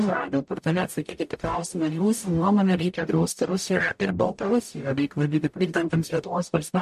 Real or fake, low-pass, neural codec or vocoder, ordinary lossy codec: fake; 14.4 kHz; codec, 44.1 kHz, 0.9 kbps, DAC; MP3, 64 kbps